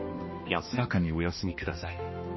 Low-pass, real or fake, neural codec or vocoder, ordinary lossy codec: 7.2 kHz; fake; codec, 16 kHz, 1 kbps, X-Codec, HuBERT features, trained on balanced general audio; MP3, 24 kbps